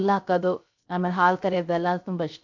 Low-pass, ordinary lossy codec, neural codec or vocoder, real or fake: 7.2 kHz; MP3, 48 kbps; codec, 16 kHz, 0.3 kbps, FocalCodec; fake